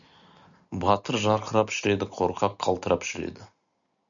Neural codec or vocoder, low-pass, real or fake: none; 7.2 kHz; real